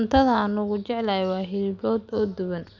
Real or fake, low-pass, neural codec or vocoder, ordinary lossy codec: real; 7.2 kHz; none; none